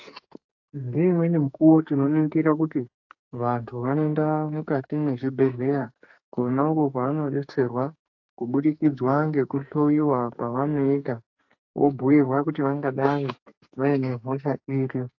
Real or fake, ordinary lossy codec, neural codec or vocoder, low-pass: fake; AAC, 48 kbps; codec, 44.1 kHz, 2.6 kbps, SNAC; 7.2 kHz